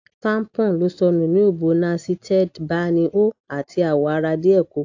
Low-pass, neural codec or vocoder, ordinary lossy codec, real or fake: 7.2 kHz; none; AAC, 48 kbps; real